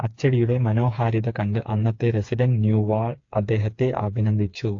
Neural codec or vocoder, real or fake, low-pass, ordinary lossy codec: codec, 16 kHz, 4 kbps, FreqCodec, smaller model; fake; 7.2 kHz; AAC, 48 kbps